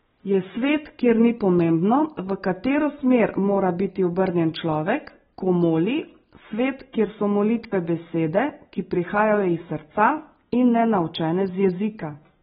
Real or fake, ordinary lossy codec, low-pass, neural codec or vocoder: real; AAC, 16 kbps; 19.8 kHz; none